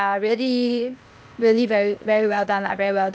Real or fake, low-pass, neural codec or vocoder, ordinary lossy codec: fake; none; codec, 16 kHz, 0.8 kbps, ZipCodec; none